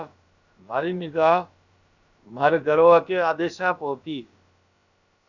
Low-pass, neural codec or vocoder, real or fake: 7.2 kHz; codec, 16 kHz, about 1 kbps, DyCAST, with the encoder's durations; fake